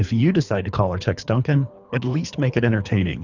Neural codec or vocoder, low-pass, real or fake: codec, 24 kHz, 3 kbps, HILCodec; 7.2 kHz; fake